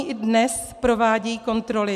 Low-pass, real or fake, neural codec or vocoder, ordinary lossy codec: 14.4 kHz; real; none; MP3, 96 kbps